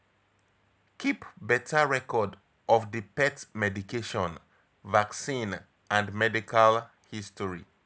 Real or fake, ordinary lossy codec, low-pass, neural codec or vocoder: real; none; none; none